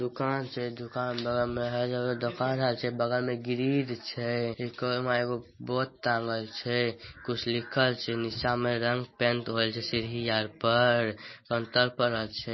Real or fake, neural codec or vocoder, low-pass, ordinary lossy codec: real; none; 7.2 kHz; MP3, 24 kbps